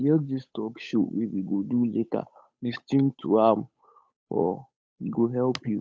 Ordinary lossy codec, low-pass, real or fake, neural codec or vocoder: none; none; fake; codec, 16 kHz, 8 kbps, FunCodec, trained on Chinese and English, 25 frames a second